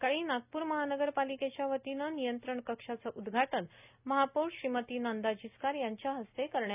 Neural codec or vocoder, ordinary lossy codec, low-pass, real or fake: none; none; 3.6 kHz; real